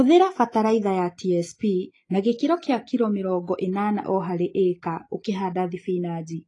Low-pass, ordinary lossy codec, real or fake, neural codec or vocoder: 10.8 kHz; AAC, 32 kbps; real; none